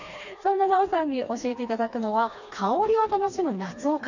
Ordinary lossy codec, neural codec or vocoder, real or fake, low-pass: none; codec, 16 kHz, 2 kbps, FreqCodec, smaller model; fake; 7.2 kHz